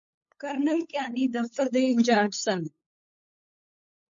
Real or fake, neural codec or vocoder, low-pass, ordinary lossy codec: fake; codec, 16 kHz, 8 kbps, FunCodec, trained on LibriTTS, 25 frames a second; 7.2 kHz; MP3, 48 kbps